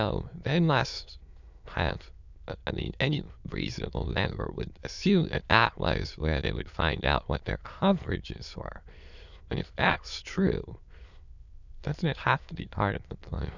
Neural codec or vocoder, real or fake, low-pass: autoencoder, 22.05 kHz, a latent of 192 numbers a frame, VITS, trained on many speakers; fake; 7.2 kHz